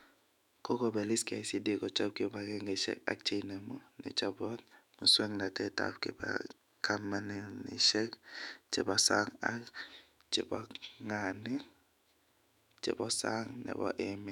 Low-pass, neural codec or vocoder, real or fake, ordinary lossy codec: 19.8 kHz; autoencoder, 48 kHz, 128 numbers a frame, DAC-VAE, trained on Japanese speech; fake; none